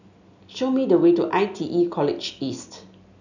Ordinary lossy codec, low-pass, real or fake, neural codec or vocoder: none; 7.2 kHz; real; none